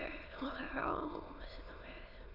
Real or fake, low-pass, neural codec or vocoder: fake; 5.4 kHz; autoencoder, 22.05 kHz, a latent of 192 numbers a frame, VITS, trained on many speakers